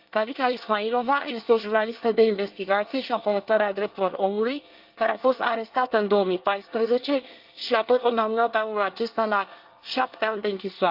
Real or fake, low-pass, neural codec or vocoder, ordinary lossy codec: fake; 5.4 kHz; codec, 24 kHz, 1 kbps, SNAC; Opus, 32 kbps